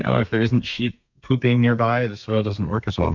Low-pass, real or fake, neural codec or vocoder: 7.2 kHz; fake; codec, 32 kHz, 1.9 kbps, SNAC